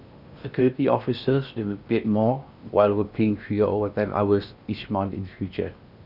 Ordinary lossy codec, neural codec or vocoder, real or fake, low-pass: Opus, 64 kbps; codec, 16 kHz in and 24 kHz out, 0.8 kbps, FocalCodec, streaming, 65536 codes; fake; 5.4 kHz